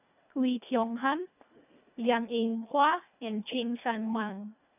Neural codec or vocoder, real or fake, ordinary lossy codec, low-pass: codec, 24 kHz, 1.5 kbps, HILCodec; fake; none; 3.6 kHz